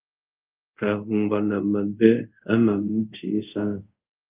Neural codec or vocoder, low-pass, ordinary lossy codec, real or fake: codec, 24 kHz, 0.5 kbps, DualCodec; 3.6 kHz; Opus, 24 kbps; fake